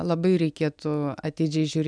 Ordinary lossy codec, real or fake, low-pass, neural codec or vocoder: MP3, 96 kbps; real; 9.9 kHz; none